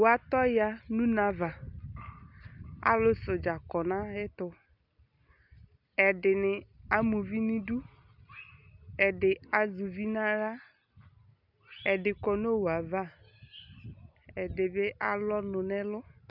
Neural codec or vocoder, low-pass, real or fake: none; 5.4 kHz; real